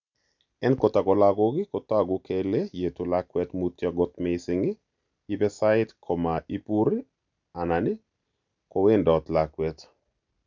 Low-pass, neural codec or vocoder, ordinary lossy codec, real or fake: 7.2 kHz; none; none; real